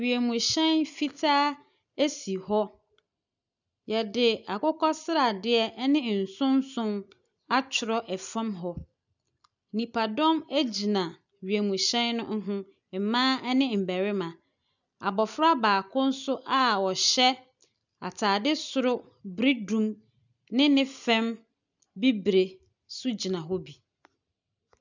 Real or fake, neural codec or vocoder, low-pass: real; none; 7.2 kHz